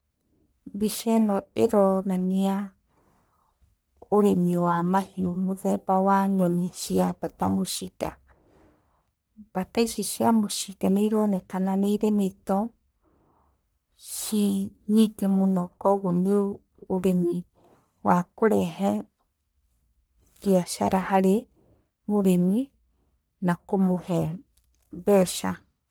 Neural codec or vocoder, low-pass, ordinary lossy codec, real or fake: codec, 44.1 kHz, 1.7 kbps, Pupu-Codec; none; none; fake